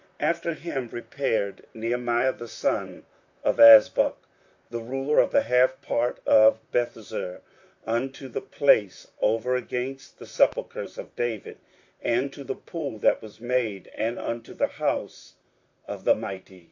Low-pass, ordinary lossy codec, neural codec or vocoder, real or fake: 7.2 kHz; AAC, 48 kbps; vocoder, 44.1 kHz, 128 mel bands, Pupu-Vocoder; fake